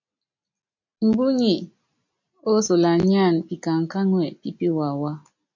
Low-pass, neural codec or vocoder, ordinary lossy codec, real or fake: 7.2 kHz; none; MP3, 48 kbps; real